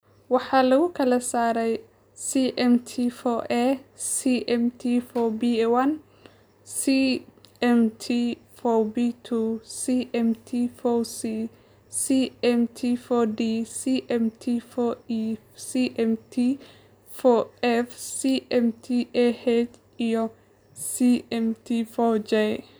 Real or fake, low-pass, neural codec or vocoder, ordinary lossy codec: real; none; none; none